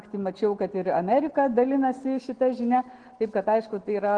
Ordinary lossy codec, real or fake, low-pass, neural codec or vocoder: Opus, 16 kbps; real; 9.9 kHz; none